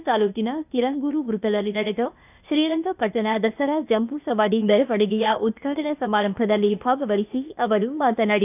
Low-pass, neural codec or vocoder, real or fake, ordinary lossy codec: 3.6 kHz; codec, 16 kHz, 0.8 kbps, ZipCodec; fake; none